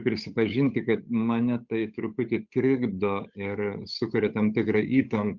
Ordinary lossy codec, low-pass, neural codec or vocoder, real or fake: Opus, 64 kbps; 7.2 kHz; codec, 16 kHz, 8 kbps, FunCodec, trained on Chinese and English, 25 frames a second; fake